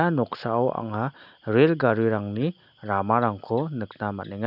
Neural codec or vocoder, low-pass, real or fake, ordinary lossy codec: none; 5.4 kHz; real; none